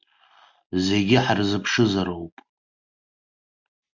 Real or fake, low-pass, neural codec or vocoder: real; 7.2 kHz; none